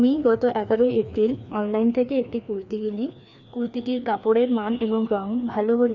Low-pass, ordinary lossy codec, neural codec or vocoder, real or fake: 7.2 kHz; none; codec, 16 kHz, 2 kbps, FreqCodec, larger model; fake